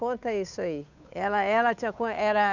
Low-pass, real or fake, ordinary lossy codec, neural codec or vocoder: 7.2 kHz; fake; none; codec, 16 kHz, 8 kbps, FunCodec, trained on Chinese and English, 25 frames a second